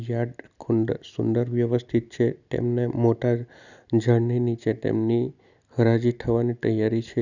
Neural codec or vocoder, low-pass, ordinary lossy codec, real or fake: none; 7.2 kHz; none; real